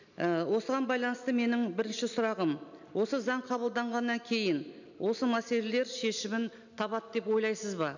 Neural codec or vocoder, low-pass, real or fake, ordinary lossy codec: none; 7.2 kHz; real; none